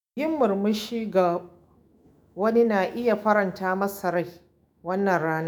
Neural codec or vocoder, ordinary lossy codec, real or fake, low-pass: autoencoder, 48 kHz, 128 numbers a frame, DAC-VAE, trained on Japanese speech; none; fake; none